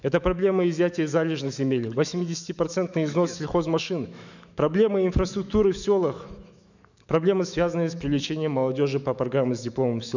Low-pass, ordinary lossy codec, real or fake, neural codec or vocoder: 7.2 kHz; none; fake; autoencoder, 48 kHz, 128 numbers a frame, DAC-VAE, trained on Japanese speech